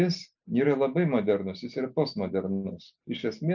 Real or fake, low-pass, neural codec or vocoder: real; 7.2 kHz; none